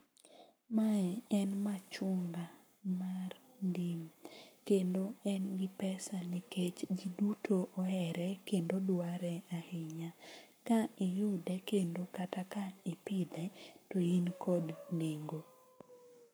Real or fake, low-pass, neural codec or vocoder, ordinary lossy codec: fake; none; codec, 44.1 kHz, 7.8 kbps, Pupu-Codec; none